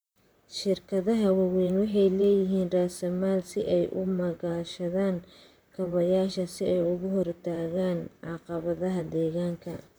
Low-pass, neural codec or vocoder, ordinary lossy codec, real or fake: none; vocoder, 44.1 kHz, 128 mel bands, Pupu-Vocoder; none; fake